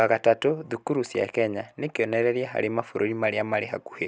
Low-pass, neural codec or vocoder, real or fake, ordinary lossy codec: none; none; real; none